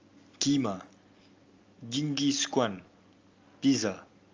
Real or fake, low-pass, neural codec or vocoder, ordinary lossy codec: real; 7.2 kHz; none; Opus, 32 kbps